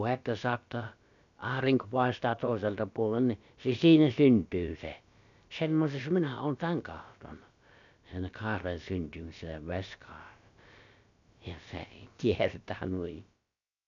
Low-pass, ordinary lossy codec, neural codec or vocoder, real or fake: 7.2 kHz; none; codec, 16 kHz, about 1 kbps, DyCAST, with the encoder's durations; fake